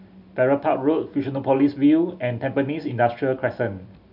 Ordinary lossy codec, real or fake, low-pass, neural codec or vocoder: none; real; 5.4 kHz; none